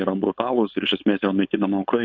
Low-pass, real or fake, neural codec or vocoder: 7.2 kHz; fake; codec, 16 kHz, 4.8 kbps, FACodec